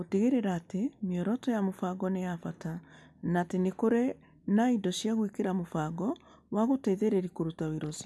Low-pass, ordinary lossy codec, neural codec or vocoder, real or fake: none; none; none; real